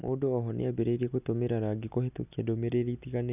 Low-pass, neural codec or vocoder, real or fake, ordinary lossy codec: 3.6 kHz; none; real; none